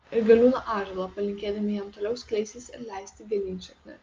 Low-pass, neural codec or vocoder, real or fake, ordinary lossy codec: 7.2 kHz; none; real; Opus, 24 kbps